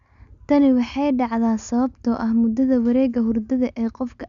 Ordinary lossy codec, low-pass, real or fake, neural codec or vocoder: none; 7.2 kHz; real; none